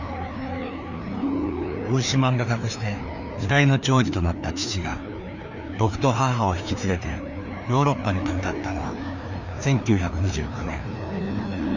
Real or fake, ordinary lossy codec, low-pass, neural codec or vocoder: fake; none; 7.2 kHz; codec, 16 kHz, 2 kbps, FreqCodec, larger model